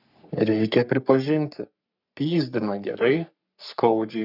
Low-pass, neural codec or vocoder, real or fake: 5.4 kHz; codec, 44.1 kHz, 3.4 kbps, Pupu-Codec; fake